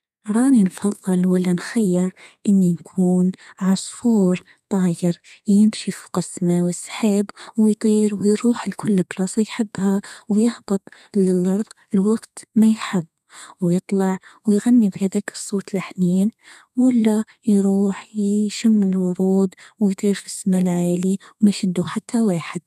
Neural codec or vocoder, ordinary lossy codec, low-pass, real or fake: codec, 32 kHz, 1.9 kbps, SNAC; none; 14.4 kHz; fake